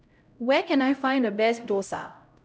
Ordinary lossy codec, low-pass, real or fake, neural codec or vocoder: none; none; fake; codec, 16 kHz, 0.5 kbps, X-Codec, HuBERT features, trained on LibriSpeech